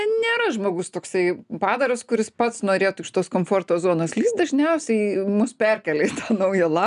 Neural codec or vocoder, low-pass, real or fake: none; 10.8 kHz; real